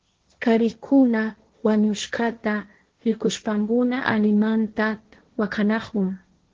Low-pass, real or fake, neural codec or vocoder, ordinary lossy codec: 7.2 kHz; fake; codec, 16 kHz, 1.1 kbps, Voila-Tokenizer; Opus, 16 kbps